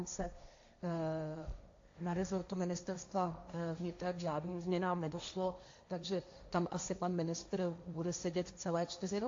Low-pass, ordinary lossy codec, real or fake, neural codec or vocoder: 7.2 kHz; AAC, 48 kbps; fake; codec, 16 kHz, 1.1 kbps, Voila-Tokenizer